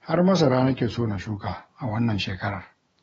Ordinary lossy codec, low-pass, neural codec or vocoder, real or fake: AAC, 24 kbps; 19.8 kHz; autoencoder, 48 kHz, 128 numbers a frame, DAC-VAE, trained on Japanese speech; fake